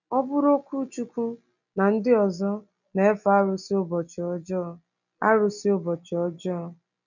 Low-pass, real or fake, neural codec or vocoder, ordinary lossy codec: 7.2 kHz; real; none; none